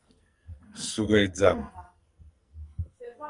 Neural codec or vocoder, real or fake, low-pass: codec, 44.1 kHz, 2.6 kbps, SNAC; fake; 10.8 kHz